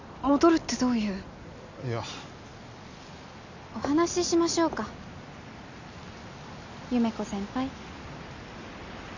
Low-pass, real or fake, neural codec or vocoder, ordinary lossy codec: 7.2 kHz; real; none; none